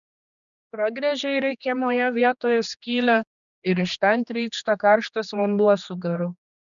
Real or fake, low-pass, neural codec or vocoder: fake; 7.2 kHz; codec, 16 kHz, 2 kbps, X-Codec, HuBERT features, trained on general audio